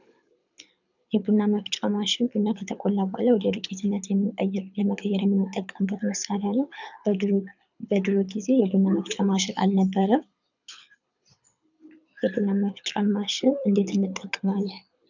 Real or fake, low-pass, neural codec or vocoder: fake; 7.2 kHz; codec, 24 kHz, 6 kbps, HILCodec